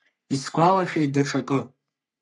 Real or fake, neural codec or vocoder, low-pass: fake; codec, 44.1 kHz, 3.4 kbps, Pupu-Codec; 10.8 kHz